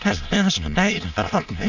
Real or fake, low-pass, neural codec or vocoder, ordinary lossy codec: fake; 7.2 kHz; autoencoder, 22.05 kHz, a latent of 192 numbers a frame, VITS, trained on many speakers; none